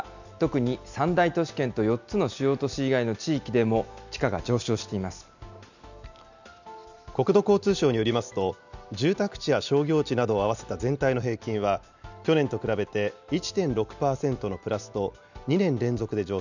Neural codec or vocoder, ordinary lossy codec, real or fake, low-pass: none; none; real; 7.2 kHz